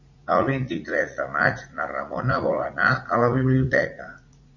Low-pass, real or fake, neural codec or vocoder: 7.2 kHz; fake; vocoder, 44.1 kHz, 80 mel bands, Vocos